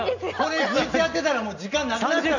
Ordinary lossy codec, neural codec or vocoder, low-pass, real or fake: none; none; 7.2 kHz; real